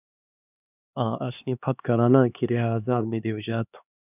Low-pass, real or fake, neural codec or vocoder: 3.6 kHz; fake; codec, 16 kHz, 2 kbps, X-Codec, HuBERT features, trained on LibriSpeech